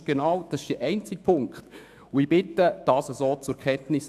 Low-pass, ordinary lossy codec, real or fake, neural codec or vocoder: 14.4 kHz; none; fake; autoencoder, 48 kHz, 128 numbers a frame, DAC-VAE, trained on Japanese speech